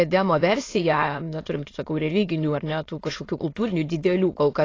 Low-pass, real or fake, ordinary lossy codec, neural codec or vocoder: 7.2 kHz; fake; AAC, 32 kbps; autoencoder, 22.05 kHz, a latent of 192 numbers a frame, VITS, trained on many speakers